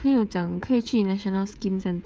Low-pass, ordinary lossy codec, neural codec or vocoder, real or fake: none; none; codec, 16 kHz, 16 kbps, FreqCodec, smaller model; fake